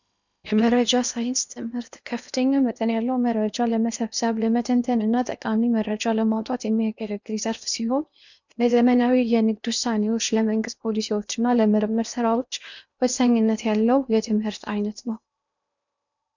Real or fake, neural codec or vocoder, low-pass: fake; codec, 16 kHz in and 24 kHz out, 0.8 kbps, FocalCodec, streaming, 65536 codes; 7.2 kHz